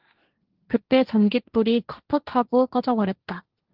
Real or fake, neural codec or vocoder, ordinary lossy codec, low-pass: fake; codec, 16 kHz, 1.1 kbps, Voila-Tokenizer; Opus, 16 kbps; 5.4 kHz